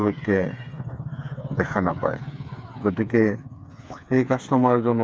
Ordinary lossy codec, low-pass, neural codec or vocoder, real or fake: none; none; codec, 16 kHz, 4 kbps, FreqCodec, smaller model; fake